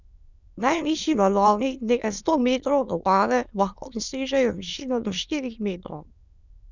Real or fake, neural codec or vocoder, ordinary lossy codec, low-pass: fake; autoencoder, 22.05 kHz, a latent of 192 numbers a frame, VITS, trained on many speakers; none; 7.2 kHz